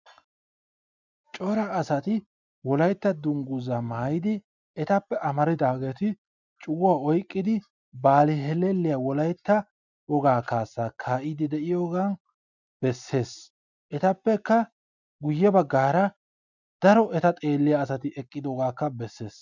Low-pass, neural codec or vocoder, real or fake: 7.2 kHz; none; real